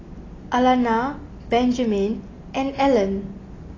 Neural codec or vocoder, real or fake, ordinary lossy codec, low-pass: none; real; AAC, 32 kbps; 7.2 kHz